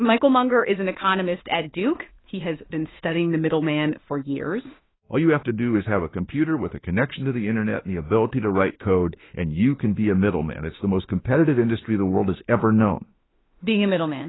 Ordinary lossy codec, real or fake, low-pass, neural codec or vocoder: AAC, 16 kbps; fake; 7.2 kHz; autoencoder, 48 kHz, 32 numbers a frame, DAC-VAE, trained on Japanese speech